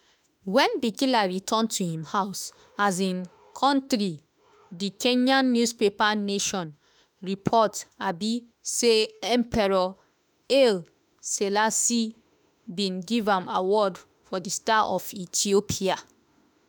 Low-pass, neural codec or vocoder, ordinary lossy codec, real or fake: none; autoencoder, 48 kHz, 32 numbers a frame, DAC-VAE, trained on Japanese speech; none; fake